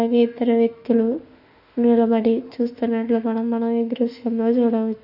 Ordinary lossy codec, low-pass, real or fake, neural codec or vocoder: none; 5.4 kHz; fake; autoencoder, 48 kHz, 32 numbers a frame, DAC-VAE, trained on Japanese speech